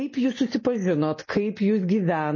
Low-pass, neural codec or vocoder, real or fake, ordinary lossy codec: 7.2 kHz; none; real; MP3, 32 kbps